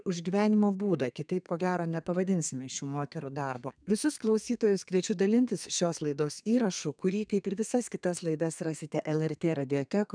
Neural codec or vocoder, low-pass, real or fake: codec, 32 kHz, 1.9 kbps, SNAC; 9.9 kHz; fake